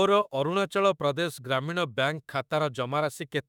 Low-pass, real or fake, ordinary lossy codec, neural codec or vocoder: 19.8 kHz; fake; none; autoencoder, 48 kHz, 32 numbers a frame, DAC-VAE, trained on Japanese speech